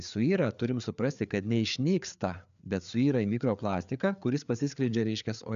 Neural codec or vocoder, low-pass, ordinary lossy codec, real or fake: codec, 16 kHz, 4 kbps, FreqCodec, larger model; 7.2 kHz; MP3, 96 kbps; fake